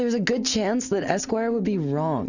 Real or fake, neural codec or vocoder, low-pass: real; none; 7.2 kHz